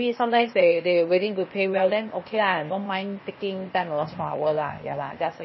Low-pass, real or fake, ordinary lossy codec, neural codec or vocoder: 7.2 kHz; fake; MP3, 24 kbps; codec, 16 kHz, 0.8 kbps, ZipCodec